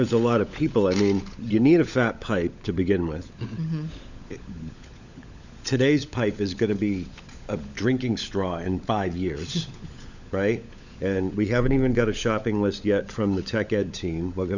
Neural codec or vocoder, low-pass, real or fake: codec, 16 kHz, 16 kbps, FunCodec, trained on LibriTTS, 50 frames a second; 7.2 kHz; fake